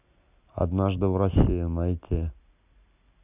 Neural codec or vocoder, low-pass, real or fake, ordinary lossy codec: none; 3.6 kHz; real; none